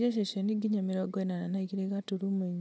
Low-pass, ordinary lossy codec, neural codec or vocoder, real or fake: none; none; none; real